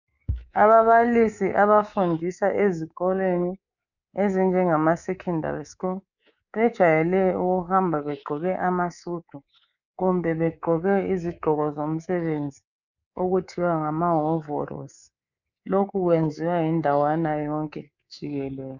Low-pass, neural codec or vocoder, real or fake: 7.2 kHz; codec, 24 kHz, 3.1 kbps, DualCodec; fake